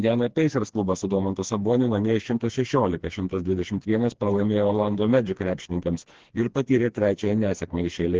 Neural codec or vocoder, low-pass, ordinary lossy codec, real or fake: codec, 16 kHz, 2 kbps, FreqCodec, smaller model; 7.2 kHz; Opus, 16 kbps; fake